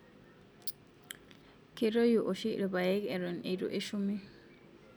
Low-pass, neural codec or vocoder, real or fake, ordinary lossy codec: none; none; real; none